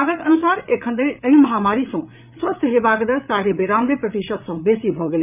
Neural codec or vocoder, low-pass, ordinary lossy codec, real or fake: codec, 16 kHz, 8 kbps, FreqCodec, larger model; 3.6 kHz; none; fake